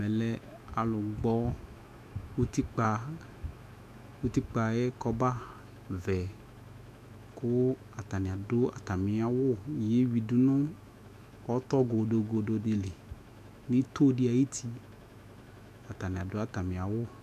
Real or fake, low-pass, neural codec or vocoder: fake; 14.4 kHz; autoencoder, 48 kHz, 128 numbers a frame, DAC-VAE, trained on Japanese speech